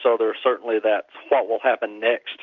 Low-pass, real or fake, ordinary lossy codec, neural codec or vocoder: 7.2 kHz; real; MP3, 64 kbps; none